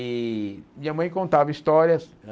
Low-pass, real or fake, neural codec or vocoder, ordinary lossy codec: none; real; none; none